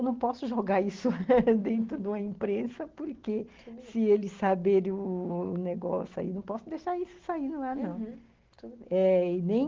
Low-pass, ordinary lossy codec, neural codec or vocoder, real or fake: 7.2 kHz; Opus, 16 kbps; none; real